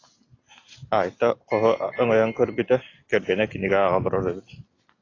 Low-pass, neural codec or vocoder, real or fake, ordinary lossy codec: 7.2 kHz; none; real; AAC, 32 kbps